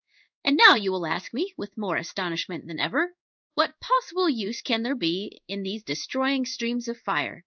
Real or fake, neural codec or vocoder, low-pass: fake; codec, 16 kHz in and 24 kHz out, 1 kbps, XY-Tokenizer; 7.2 kHz